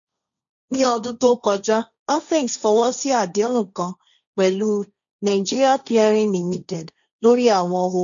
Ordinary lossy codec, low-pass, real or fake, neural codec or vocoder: none; 7.2 kHz; fake; codec, 16 kHz, 1.1 kbps, Voila-Tokenizer